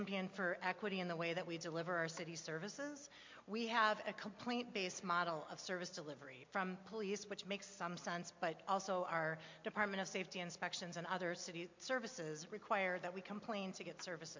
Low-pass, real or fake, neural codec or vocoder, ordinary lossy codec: 7.2 kHz; real; none; MP3, 48 kbps